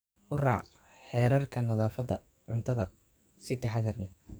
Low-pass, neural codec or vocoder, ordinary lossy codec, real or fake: none; codec, 44.1 kHz, 2.6 kbps, SNAC; none; fake